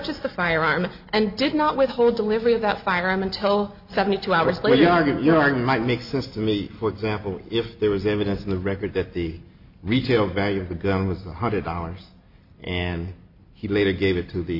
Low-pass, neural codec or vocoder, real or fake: 5.4 kHz; none; real